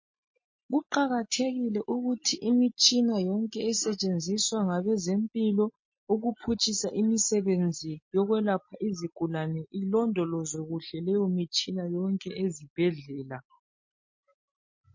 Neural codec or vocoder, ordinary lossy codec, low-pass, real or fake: none; MP3, 32 kbps; 7.2 kHz; real